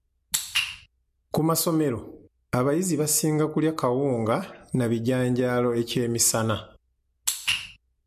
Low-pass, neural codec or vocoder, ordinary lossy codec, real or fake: 14.4 kHz; none; MP3, 64 kbps; real